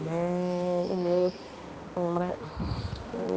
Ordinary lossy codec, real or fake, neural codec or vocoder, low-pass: none; fake; codec, 16 kHz, 2 kbps, X-Codec, HuBERT features, trained on balanced general audio; none